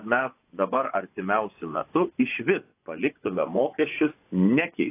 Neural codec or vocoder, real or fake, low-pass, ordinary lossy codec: vocoder, 24 kHz, 100 mel bands, Vocos; fake; 3.6 kHz; AAC, 24 kbps